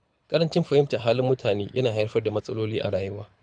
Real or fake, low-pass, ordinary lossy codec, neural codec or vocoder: fake; 9.9 kHz; MP3, 96 kbps; codec, 24 kHz, 6 kbps, HILCodec